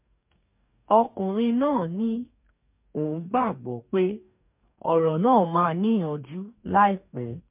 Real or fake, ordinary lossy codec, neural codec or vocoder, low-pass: fake; MP3, 32 kbps; codec, 44.1 kHz, 2.6 kbps, DAC; 3.6 kHz